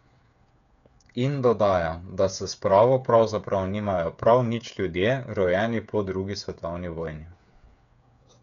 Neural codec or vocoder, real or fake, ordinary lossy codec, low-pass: codec, 16 kHz, 8 kbps, FreqCodec, smaller model; fake; none; 7.2 kHz